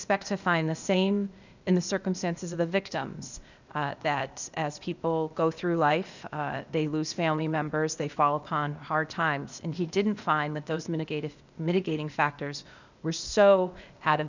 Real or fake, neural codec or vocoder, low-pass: fake; codec, 16 kHz, 0.8 kbps, ZipCodec; 7.2 kHz